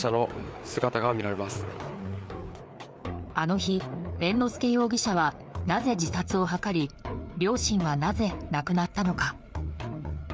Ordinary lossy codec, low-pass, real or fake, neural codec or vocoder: none; none; fake; codec, 16 kHz, 4 kbps, FreqCodec, larger model